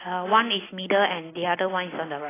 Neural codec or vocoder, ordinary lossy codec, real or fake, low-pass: none; AAC, 16 kbps; real; 3.6 kHz